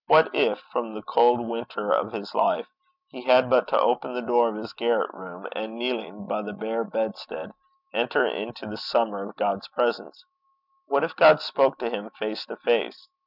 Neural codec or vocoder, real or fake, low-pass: none; real; 5.4 kHz